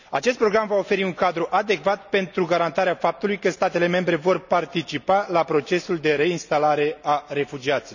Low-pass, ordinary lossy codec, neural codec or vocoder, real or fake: 7.2 kHz; none; none; real